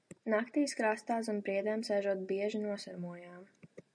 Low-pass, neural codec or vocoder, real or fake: 9.9 kHz; none; real